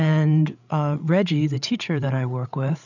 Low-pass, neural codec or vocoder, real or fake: 7.2 kHz; codec, 16 kHz, 8 kbps, FreqCodec, larger model; fake